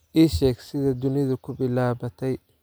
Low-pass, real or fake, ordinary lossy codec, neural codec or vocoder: none; real; none; none